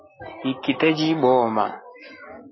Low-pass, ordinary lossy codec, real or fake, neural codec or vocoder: 7.2 kHz; MP3, 24 kbps; real; none